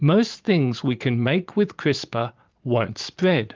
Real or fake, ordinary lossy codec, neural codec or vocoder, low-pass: fake; Opus, 32 kbps; vocoder, 44.1 kHz, 80 mel bands, Vocos; 7.2 kHz